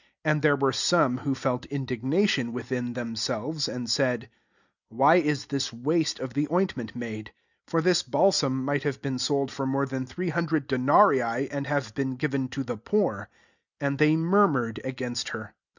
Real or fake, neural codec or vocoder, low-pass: real; none; 7.2 kHz